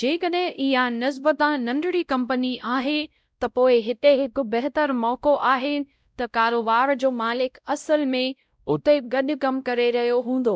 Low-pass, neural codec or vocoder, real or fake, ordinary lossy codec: none; codec, 16 kHz, 0.5 kbps, X-Codec, WavLM features, trained on Multilingual LibriSpeech; fake; none